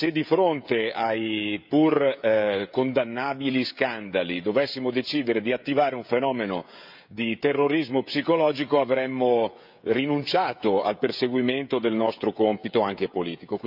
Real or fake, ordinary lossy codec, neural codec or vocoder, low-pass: fake; none; codec, 16 kHz, 16 kbps, FreqCodec, smaller model; 5.4 kHz